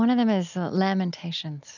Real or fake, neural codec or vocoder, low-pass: real; none; 7.2 kHz